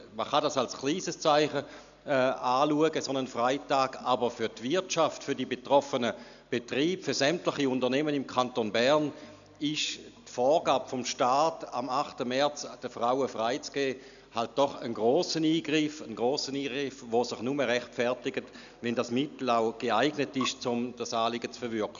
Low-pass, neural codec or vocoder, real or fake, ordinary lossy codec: 7.2 kHz; none; real; none